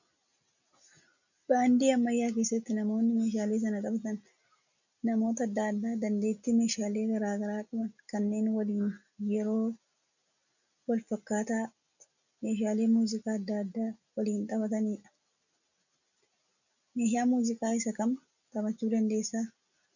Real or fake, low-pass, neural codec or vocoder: real; 7.2 kHz; none